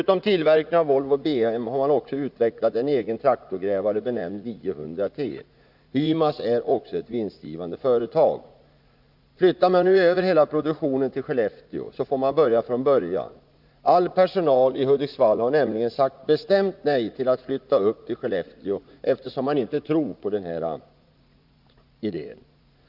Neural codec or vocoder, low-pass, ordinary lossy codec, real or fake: vocoder, 44.1 kHz, 80 mel bands, Vocos; 5.4 kHz; Opus, 64 kbps; fake